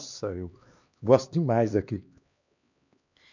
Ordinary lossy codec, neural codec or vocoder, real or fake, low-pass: none; codec, 16 kHz, 2 kbps, X-Codec, HuBERT features, trained on LibriSpeech; fake; 7.2 kHz